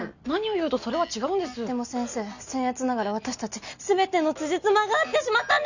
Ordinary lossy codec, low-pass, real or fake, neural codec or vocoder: none; 7.2 kHz; real; none